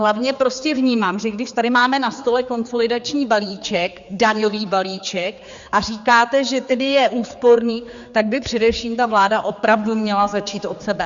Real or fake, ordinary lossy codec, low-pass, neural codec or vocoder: fake; Opus, 64 kbps; 7.2 kHz; codec, 16 kHz, 4 kbps, X-Codec, HuBERT features, trained on general audio